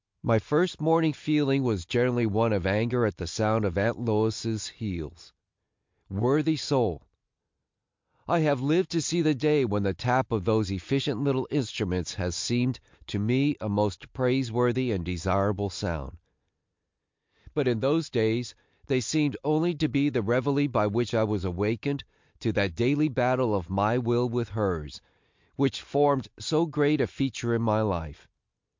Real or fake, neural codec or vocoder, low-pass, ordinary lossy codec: real; none; 7.2 kHz; MP3, 64 kbps